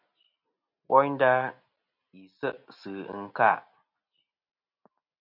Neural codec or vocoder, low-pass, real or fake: none; 5.4 kHz; real